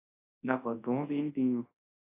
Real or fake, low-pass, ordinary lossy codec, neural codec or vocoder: fake; 3.6 kHz; AAC, 16 kbps; codec, 24 kHz, 0.9 kbps, WavTokenizer, large speech release